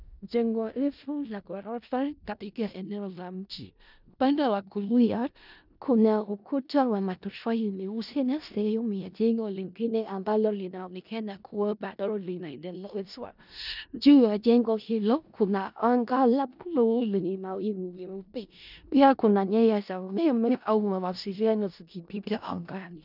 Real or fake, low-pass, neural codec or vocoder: fake; 5.4 kHz; codec, 16 kHz in and 24 kHz out, 0.4 kbps, LongCat-Audio-Codec, four codebook decoder